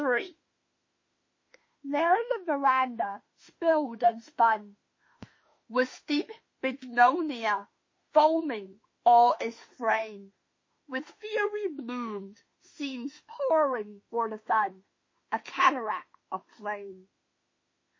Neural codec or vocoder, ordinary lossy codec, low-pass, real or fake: autoencoder, 48 kHz, 32 numbers a frame, DAC-VAE, trained on Japanese speech; MP3, 32 kbps; 7.2 kHz; fake